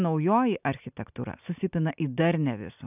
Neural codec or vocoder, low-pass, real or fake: none; 3.6 kHz; real